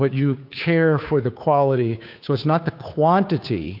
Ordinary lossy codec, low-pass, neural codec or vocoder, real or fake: AAC, 48 kbps; 5.4 kHz; codec, 16 kHz, 2 kbps, FunCodec, trained on Chinese and English, 25 frames a second; fake